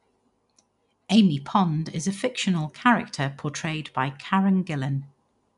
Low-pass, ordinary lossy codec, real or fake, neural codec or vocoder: 10.8 kHz; none; real; none